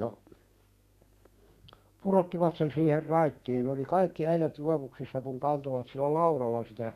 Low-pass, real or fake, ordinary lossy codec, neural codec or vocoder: 14.4 kHz; fake; none; codec, 32 kHz, 1.9 kbps, SNAC